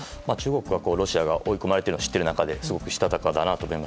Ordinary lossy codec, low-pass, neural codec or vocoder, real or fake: none; none; none; real